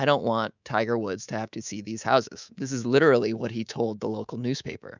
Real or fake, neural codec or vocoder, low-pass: fake; codec, 24 kHz, 3.1 kbps, DualCodec; 7.2 kHz